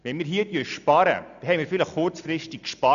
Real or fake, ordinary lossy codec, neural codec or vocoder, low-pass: real; none; none; 7.2 kHz